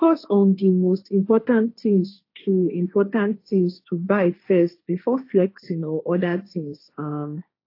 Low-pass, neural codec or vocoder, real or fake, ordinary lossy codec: 5.4 kHz; codec, 16 kHz, 1.1 kbps, Voila-Tokenizer; fake; AAC, 32 kbps